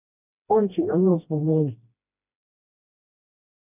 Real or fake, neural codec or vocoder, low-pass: fake; codec, 16 kHz, 1 kbps, FreqCodec, smaller model; 3.6 kHz